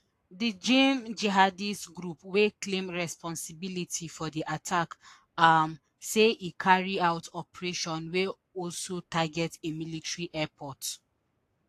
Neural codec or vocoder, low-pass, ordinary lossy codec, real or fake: codec, 44.1 kHz, 7.8 kbps, Pupu-Codec; 14.4 kHz; AAC, 64 kbps; fake